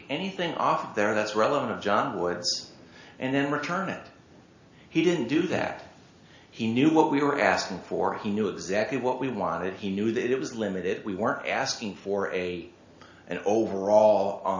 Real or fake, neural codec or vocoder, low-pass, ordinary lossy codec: real; none; 7.2 kHz; AAC, 48 kbps